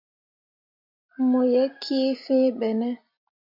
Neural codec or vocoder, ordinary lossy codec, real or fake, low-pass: none; MP3, 48 kbps; real; 5.4 kHz